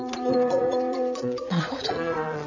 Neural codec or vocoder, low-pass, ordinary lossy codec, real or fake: vocoder, 22.05 kHz, 80 mel bands, Vocos; 7.2 kHz; none; fake